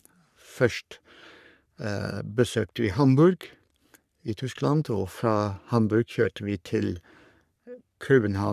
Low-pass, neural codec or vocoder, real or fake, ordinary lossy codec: 14.4 kHz; codec, 44.1 kHz, 3.4 kbps, Pupu-Codec; fake; none